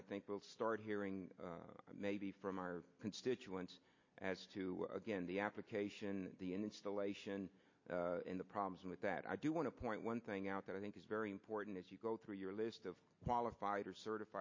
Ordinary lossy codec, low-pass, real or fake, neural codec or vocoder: MP3, 32 kbps; 7.2 kHz; real; none